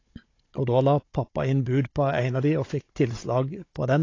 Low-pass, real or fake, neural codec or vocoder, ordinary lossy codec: 7.2 kHz; fake; codec, 16 kHz, 16 kbps, FunCodec, trained on Chinese and English, 50 frames a second; AAC, 32 kbps